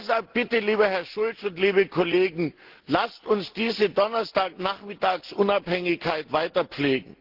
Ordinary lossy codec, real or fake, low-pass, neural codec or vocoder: Opus, 16 kbps; real; 5.4 kHz; none